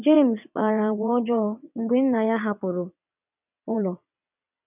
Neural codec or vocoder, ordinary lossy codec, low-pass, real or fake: vocoder, 22.05 kHz, 80 mel bands, WaveNeXt; none; 3.6 kHz; fake